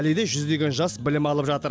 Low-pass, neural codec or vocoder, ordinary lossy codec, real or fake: none; none; none; real